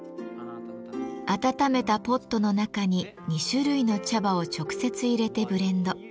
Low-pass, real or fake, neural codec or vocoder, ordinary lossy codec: none; real; none; none